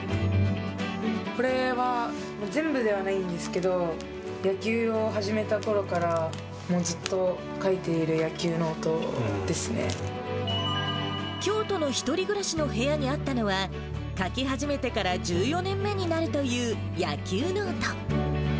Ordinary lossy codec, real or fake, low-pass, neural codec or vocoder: none; real; none; none